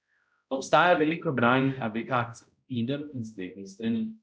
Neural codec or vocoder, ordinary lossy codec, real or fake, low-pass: codec, 16 kHz, 0.5 kbps, X-Codec, HuBERT features, trained on balanced general audio; none; fake; none